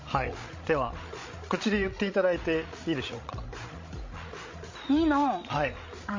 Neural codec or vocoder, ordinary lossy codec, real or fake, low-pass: codec, 16 kHz, 16 kbps, FreqCodec, larger model; MP3, 32 kbps; fake; 7.2 kHz